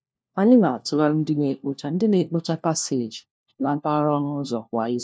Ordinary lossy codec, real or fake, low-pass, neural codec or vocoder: none; fake; none; codec, 16 kHz, 1 kbps, FunCodec, trained on LibriTTS, 50 frames a second